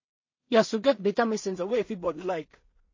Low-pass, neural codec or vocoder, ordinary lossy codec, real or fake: 7.2 kHz; codec, 16 kHz in and 24 kHz out, 0.4 kbps, LongCat-Audio-Codec, two codebook decoder; MP3, 32 kbps; fake